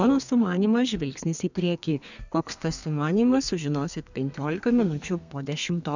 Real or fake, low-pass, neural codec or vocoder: fake; 7.2 kHz; codec, 32 kHz, 1.9 kbps, SNAC